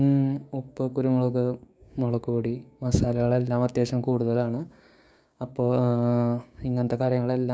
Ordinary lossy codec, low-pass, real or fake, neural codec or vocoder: none; none; fake; codec, 16 kHz, 6 kbps, DAC